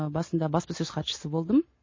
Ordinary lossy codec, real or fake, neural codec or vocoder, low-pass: MP3, 32 kbps; real; none; 7.2 kHz